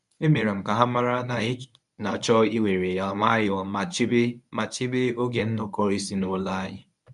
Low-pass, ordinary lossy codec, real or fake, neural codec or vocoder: 10.8 kHz; none; fake; codec, 24 kHz, 0.9 kbps, WavTokenizer, medium speech release version 1